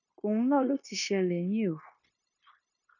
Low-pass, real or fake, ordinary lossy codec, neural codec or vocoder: 7.2 kHz; fake; none; codec, 16 kHz, 0.9 kbps, LongCat-Audio-Codec